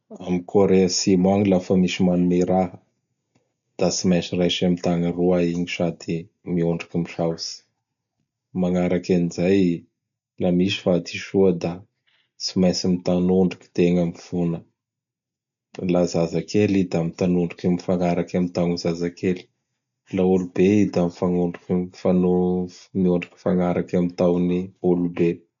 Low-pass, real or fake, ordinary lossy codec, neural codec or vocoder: 7.2 kHz; real; none; none